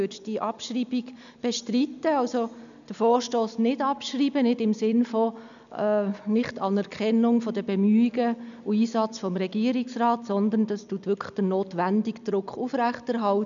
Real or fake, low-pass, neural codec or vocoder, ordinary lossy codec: real; 7.2 kHz; none; none